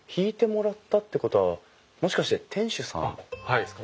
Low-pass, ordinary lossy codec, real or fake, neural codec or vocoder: none; none; real; none